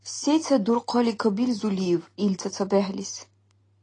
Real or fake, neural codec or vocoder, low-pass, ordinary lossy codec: real; none; 9.9 kHz; AAC, 32 kbps